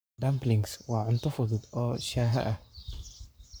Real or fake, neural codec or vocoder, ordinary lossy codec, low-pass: fake; codec, 44.1 kHz, 7.8 kbps, Pupu-Codec; none; none